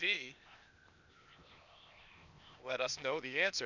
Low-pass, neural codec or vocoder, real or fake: 7.2 kHz; codec, 16 kHz, 0.8 kbps, ZipCodec; fake